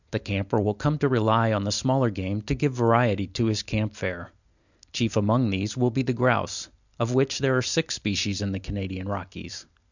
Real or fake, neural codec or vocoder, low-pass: real; none; 7.2 kHz